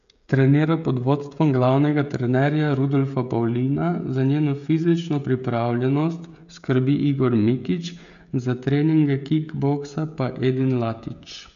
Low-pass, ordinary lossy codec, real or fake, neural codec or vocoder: 7.2 kHz; none; fake; codec, 16 kHz, 16 kbps, FreqCodec, smaller model